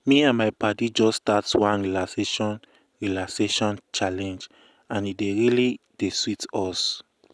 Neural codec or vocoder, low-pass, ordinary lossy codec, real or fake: none; none; none; real